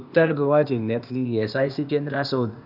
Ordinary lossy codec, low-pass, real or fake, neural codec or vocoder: none; 5.4 kHz; fake; codec, 16 kHz, 0.8 kbps, ZipCodec